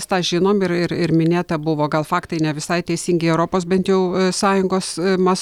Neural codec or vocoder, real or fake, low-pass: none; real; 19.8 kHz